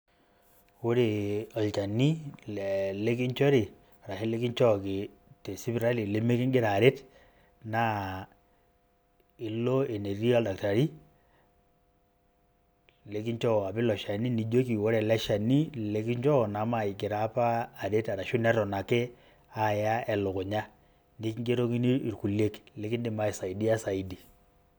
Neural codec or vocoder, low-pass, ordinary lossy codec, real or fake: none; none; none; real